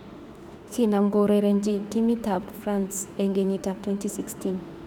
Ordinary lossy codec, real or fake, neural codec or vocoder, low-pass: none; fake; autoencoder, 48 kHz, 32 numbers a frame, DAC-VAE, trained on Japanese speech; 19.8 kHz